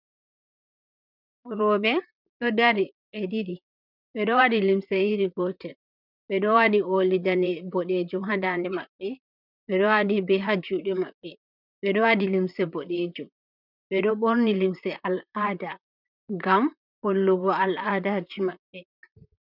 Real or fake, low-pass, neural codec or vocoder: fake; 5.4 kHz; vocoder, 44.1 kHz, 128 mel bands, Pupu-Vocoder